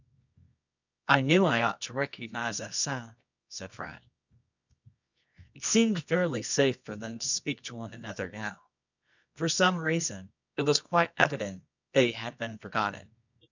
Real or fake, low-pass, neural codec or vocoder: fake; 7.2 kHz; codec, 24 kHz, 0.9 kbps, WavTokenizer, medium music audio release